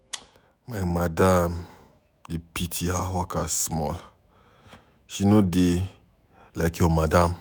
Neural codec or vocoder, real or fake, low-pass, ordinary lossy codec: autoencoder, 48 kHz, 128 numbers a frame, DAC-VAE, trained on Japanese speech; fake; none; none